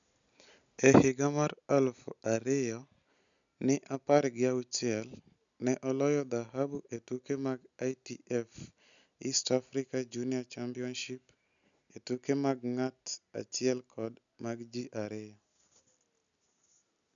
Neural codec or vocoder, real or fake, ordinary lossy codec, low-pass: none; real; MP3, 96 kbps; 7.2 kHz